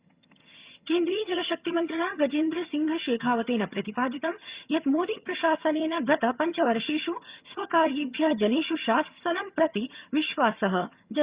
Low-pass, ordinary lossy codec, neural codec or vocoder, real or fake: 3.6 kHz; Opus, 64 kbps; vocoder, 22.05 kHz, 80 mel bands, HiFi-GAN; fake